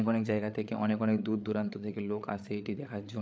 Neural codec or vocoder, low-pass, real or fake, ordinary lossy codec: codec, 16 kHz, 8 kbps, FreqCodec, larger model; none; fake; none